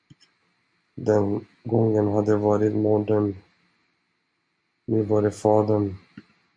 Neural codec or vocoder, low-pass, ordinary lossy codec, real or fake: none; 9.9 kHz; MP3, 48 kbps; real